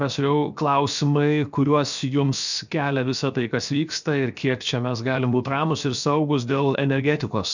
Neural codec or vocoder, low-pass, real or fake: codec, 16 kHz, 0.7 kbps, FocalCodec; 7.2 kHz; fake